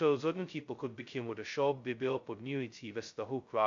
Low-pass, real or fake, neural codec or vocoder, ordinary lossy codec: 7.2 kHz; fake; codec, 16 kHz, 0.2 kbps, FocalCodec; MP3, 48 kbps